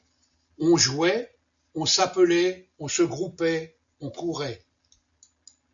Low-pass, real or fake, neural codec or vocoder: 7.2 kHz; real; none